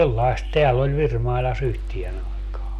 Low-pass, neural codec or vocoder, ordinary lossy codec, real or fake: 14.4 kHz; none; none; real